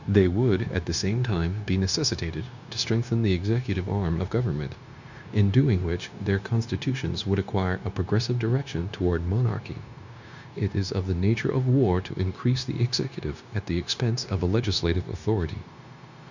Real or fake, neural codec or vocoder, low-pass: fake; codec, 16 kHz, 0.9 kbps, LongCat-Audio-Codec; 7.2 kHz